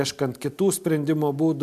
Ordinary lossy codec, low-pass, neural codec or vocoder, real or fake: MP3, 96 kbps; 14.4 kHz; none; real